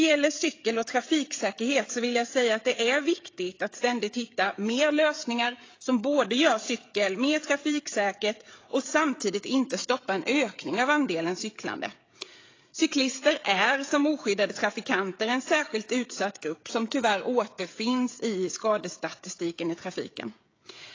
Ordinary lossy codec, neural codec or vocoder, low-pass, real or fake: AAC, 32 kbps; codec, 16 kHz, 16 kbps, FreqCodec, smaller model; 7.2 kHz; fake